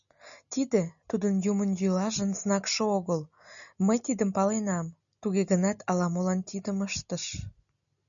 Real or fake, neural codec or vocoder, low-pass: real; none; 7.2 kHz